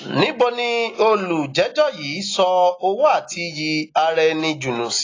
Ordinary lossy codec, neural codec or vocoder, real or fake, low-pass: AAC, 32 kbps; none; real; 7.2 kHz